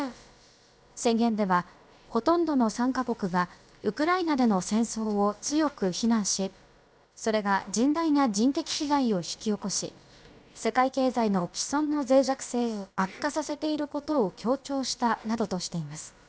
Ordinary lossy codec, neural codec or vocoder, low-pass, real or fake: none; codec, 16 kHz, about 1 kbps, DyCAST, with the encoder's durations; none; fake